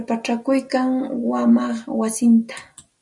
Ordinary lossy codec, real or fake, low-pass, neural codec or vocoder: MP3, 96 kbps; real; 10.8 kHz; none